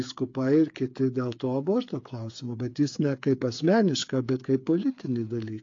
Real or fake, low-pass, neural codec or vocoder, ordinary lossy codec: fake; 7.2 kHz; codec, 16 kHz, 8 kbps, FreqCodec, smaller model; AAC, 48 kbps